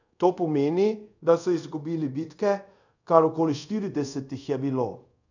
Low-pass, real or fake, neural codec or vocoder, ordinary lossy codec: 7.2 kHz; fake; codec, 24 kHz, 0.5 kbps, DualCodec; none